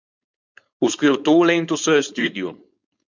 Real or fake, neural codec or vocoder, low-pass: fake; codec, 16 kHz, 4.8 kbps, FACodec; 7.2 kHz